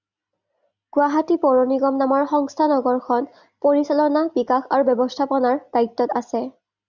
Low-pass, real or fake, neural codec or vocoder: 7.2 kHz; real; none